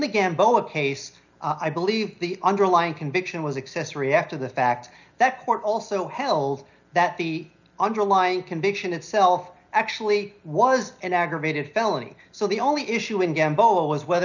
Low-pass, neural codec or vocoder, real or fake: 7.2 kHz; none; real